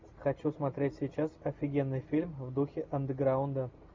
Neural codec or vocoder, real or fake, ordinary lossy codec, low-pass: none; real; MP3, 64 kbps; 7.2 kHz